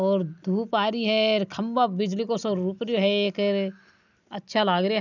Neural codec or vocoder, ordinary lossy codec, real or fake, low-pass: none; none; real; 7.2 kHz